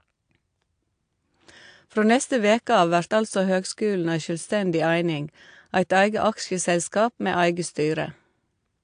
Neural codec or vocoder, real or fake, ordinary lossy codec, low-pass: none; real; AAC, 64 kbps; 9.9 kHz